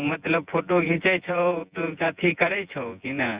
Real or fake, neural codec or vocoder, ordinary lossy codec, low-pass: fake; vocoder, 24 kHz, 100 mel bands, Vocos; Opus, 64 kbps; 3.6 kHz